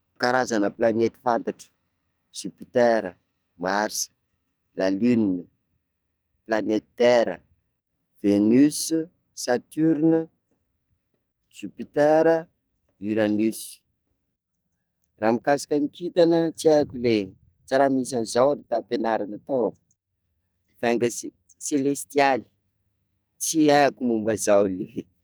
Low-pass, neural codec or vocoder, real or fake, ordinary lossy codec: none; codec, 44.1 kHz, 2.6 kbps, SNAC; fake; none